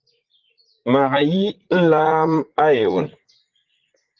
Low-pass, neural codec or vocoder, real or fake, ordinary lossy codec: 7.2 kHz; vocoder, 44.1 kHz, 80 mel bands, Vocos; fake; Opus, 16 kbps